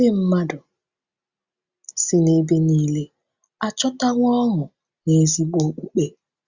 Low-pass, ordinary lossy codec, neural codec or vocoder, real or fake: 7.2 kHz; Opus, 64 kbps; none; real